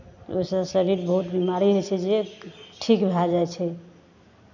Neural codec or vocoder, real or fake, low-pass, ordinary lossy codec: none; real; 7.2 kHz; none